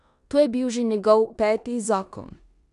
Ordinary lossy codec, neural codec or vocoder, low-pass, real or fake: none; codec, 16 kHz in and 24 kHz out, 0.9 kbps, LongCat-Audio-Codec, four codebook decoder; 10.8 kHz; fake